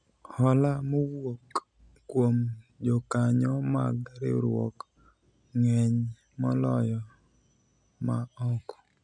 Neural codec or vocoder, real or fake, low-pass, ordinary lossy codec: none; real; 9.9 kHz; none